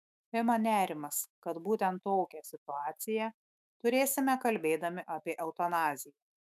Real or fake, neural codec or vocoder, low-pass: fake; autoencoder, 48 kHz, 128 numbers a frame, DAC-VAE, trained on Japanese speech; 14.4 kHz